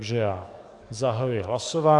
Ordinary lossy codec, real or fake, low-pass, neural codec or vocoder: AAC, 64 kbps; fake; 10.8 kHz; codec, 44.1 kHz, 7.8 kbps, DAC